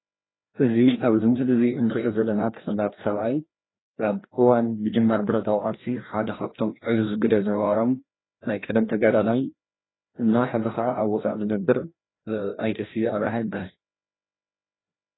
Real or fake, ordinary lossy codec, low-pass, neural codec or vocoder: fake; AAC, 16 kbps; 7.2 kHz; codec, 16 kHz, 1 kbps, FreqCodec, larger model